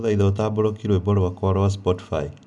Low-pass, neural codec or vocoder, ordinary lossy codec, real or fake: 10.8 kHz; none; none; real